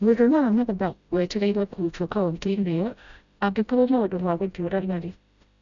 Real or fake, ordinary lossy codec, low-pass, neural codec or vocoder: fake; none; 7.2 kHz; codec, 16 kHz, 0.5 kbps, FreqCodec, smaller model